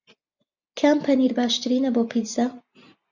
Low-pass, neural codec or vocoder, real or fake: 7.2 kHz; none; real